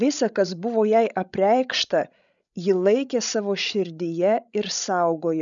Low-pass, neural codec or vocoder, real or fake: 7.2 kHz; codec, 16 kHz, 16 kbps, FreqCodec, larger model; fake